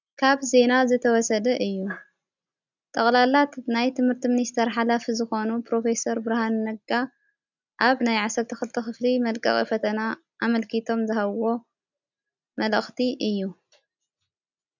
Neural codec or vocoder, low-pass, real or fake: none; 7.2 kHz; real